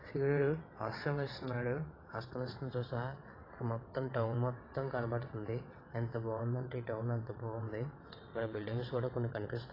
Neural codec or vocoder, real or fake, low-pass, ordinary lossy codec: vocoder, 22.05 kHz, 80 mel bands, WaveNeXt; fake; 5.4 kHz; AAC, 24 kbps